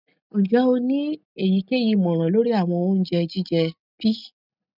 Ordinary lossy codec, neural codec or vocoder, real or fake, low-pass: none; none; real; 5.4 kHz